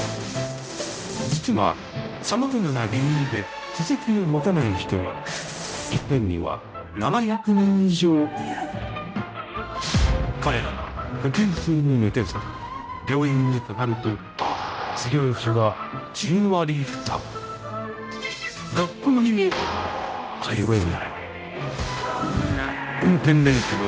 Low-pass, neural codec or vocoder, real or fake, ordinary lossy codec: none; codec, 16 kHz, 0.5 kbps, X-Codec, HuBERT features, trained on general audio; fake; none